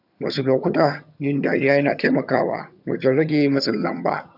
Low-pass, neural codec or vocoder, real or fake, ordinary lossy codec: 5.4 kHz; vocoder, 22.05 kHz, 80 mel bands, HiFi-GAN; fake; none